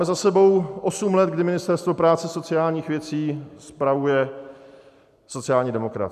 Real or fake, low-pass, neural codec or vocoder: real; 14.4 kHz; none